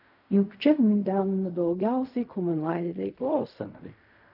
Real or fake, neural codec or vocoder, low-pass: fake; codec, 16 kHz in and 24 kHz out, 0.4 kbps, LongCat-Audio-Codec, fine tuned four codebook decoder; 5.4 kHz